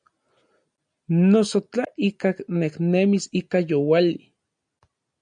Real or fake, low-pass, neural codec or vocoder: real; 9.9 kHz; none